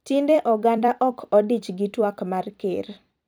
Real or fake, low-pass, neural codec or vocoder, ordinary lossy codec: fake; none; vocoder, 44.1 kHz, 128 mel bands every 256 samples, BigVGAN v2; none